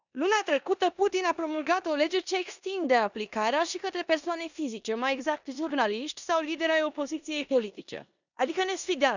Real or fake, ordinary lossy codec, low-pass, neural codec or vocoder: fake; none; 7.2 kHz; codec, 16 kHz in and 24 kHz out, 0.9 kbps, LongCat-Audio-Codec, four codebook decoder